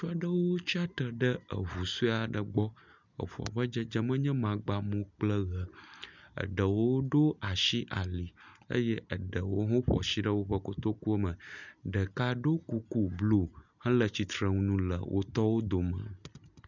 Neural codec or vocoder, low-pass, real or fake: none; 7.2 kHz; real